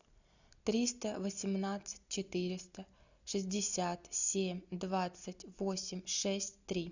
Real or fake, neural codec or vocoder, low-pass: real; none; 7.2 kHz